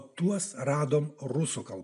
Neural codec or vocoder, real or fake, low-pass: none; real; 10.8 kHz